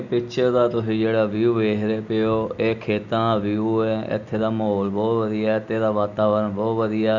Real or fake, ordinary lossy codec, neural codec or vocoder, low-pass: real; none; none; 7.2 kHz